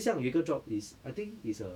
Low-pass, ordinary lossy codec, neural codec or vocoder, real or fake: 19.8 kHz; none; autoencoder, 48 kHz, 128 numbers a frame, DAC-VAE, trained on Japanese speech; fake